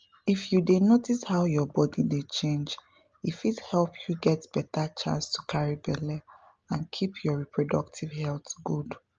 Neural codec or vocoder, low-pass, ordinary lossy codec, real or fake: none; 7.2 kHz; Opus, 24 kbps; real